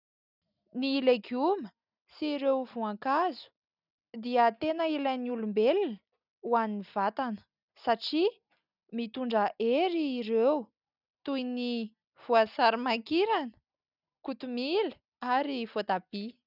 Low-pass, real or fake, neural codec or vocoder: 5.4 kHz; real; none